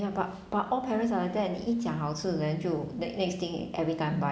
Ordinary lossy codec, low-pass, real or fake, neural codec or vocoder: none; none; real; none